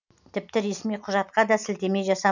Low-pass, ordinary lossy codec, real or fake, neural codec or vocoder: 7.2 kHz; none; real; none